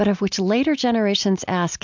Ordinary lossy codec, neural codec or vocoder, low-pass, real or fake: MP3, 64 kbps; none; 7.2 kHz; real